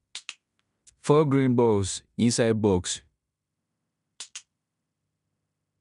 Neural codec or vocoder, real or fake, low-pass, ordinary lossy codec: codec, 16 kHz in and 24 kHz out, 0.9 kbps, LongCat-Audio-Codec, fine tuned four codebook decoder; fake; 10.8 kHz; none